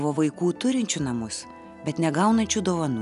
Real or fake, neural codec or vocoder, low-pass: real; none; 10.8 kHz